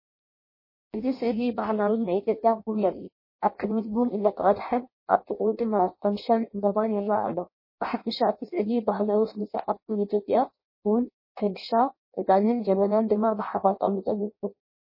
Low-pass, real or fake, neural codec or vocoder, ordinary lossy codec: 5.4 kHz; fake; codec, 16 kHz in and 24 kHz out, 0.6 kbps, FireRedTTS-2 codec; MP3, 24 kbps